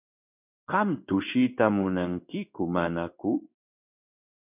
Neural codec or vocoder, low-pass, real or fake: codec, 16 kHz in and 24 kHz out, 1 kbps, XY-Tokenizer; 3.6 kHz; fake